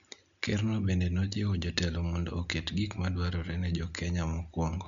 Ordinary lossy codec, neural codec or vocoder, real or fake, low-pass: none; none; real; 7.2 kHz